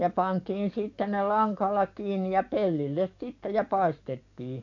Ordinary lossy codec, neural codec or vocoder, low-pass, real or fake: AAC, 48 kbps; codec, 44.1 kHz, 7.8 kbps, DAC; 7.2 kHz; fake